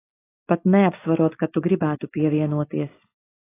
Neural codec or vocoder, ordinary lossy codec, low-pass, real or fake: none; AAC, 16 kbps; 3.6 kHz; real